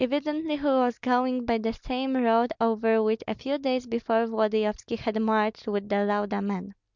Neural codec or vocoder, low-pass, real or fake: none; 7.2 kHz; real